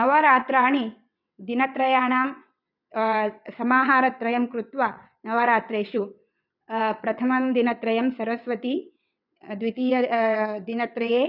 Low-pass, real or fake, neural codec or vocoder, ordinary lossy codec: 5.4 kHz; fake; vocoder, 22.05 kHz, 80 mel bands, WaveNeXt; none